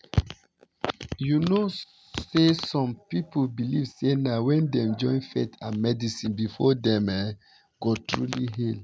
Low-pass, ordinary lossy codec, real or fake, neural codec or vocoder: none; none; real; none